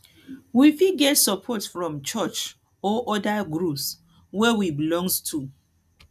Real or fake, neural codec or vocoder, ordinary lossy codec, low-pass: real; none; none; 14.4 kHz